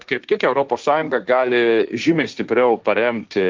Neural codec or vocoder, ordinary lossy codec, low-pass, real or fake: codec, 16 kHz, 1.1 kbps, Voila-Tokenizer; Opus, 24 kbps; 7.2 kHz; fake